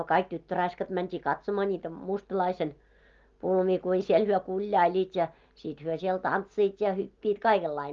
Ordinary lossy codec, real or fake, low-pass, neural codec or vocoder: Opus, 32 kbps; real; 7.2 kHz; none